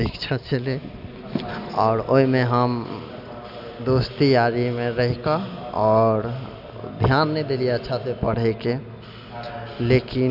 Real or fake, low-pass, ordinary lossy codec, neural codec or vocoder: real; 5.4 kHz; none; none